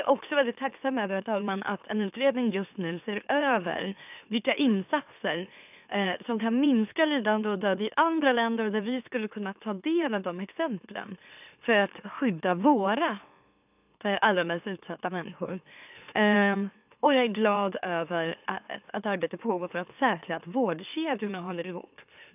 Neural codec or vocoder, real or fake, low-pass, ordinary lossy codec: autoencoder, 44.1 kHz, a latent of 192 numbers a frame, MeloTTS; fake; 3.6 kHz; none